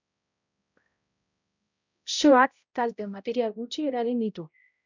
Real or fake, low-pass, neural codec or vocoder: fake; 7.2 kHz; codec, 16 kHz, 0.5 kbps, X-Codec, HuBERT features, trained on balanced general audio